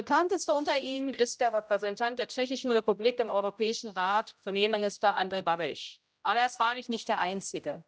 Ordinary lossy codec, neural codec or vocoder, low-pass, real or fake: none; codec, 16 kHz, 0.5 kbps, X-Codec, HuBERT features, trained on general audio; none; fake